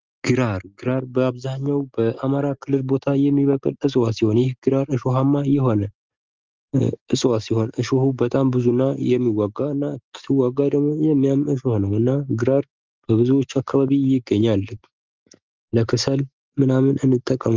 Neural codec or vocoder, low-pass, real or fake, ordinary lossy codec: none; 7.2 kHz; real; Opus, 32 kbps